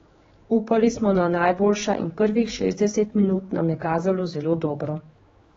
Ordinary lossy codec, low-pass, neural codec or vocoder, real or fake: AAC, 24 kbps; 7.2 kHz; codec, 16 kHz, 4 kbps, X-Codec, HuBERT features, trained on general audio; fake